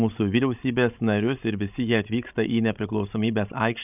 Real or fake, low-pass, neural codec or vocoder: fake; 3.6 kHz; codec, 16 kHz, 16 kbps, FreqCodec, larger model